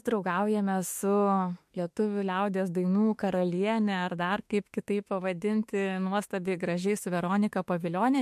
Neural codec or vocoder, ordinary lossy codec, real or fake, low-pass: autoencoder, 48 kHz, 32 numbers a frame, DAC-VAE, trained on Japanese speech; MP3, 64 kbps; fake; 14.4 kHz